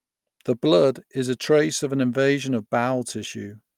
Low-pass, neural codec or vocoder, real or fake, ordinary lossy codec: 19.8 kHz; none; real; Opus, 32 kbps